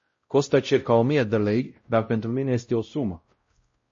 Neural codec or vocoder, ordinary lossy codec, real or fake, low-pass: codec, 16 kHz, 0.5 kbps, X-Codec, WavLM features, trained on Multilingual LibriSpeech; MP3, 32 kbps; fake; 7.2 kHz